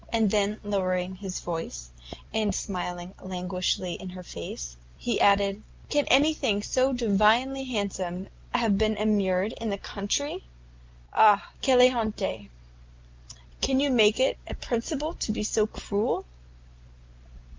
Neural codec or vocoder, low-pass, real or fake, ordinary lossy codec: none; 7.2 kHz; real; Opus, 32 kbps